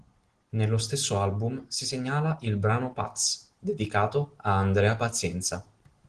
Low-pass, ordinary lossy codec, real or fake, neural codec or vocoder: 9.9 kHz; Opus, 16 kbps; real; none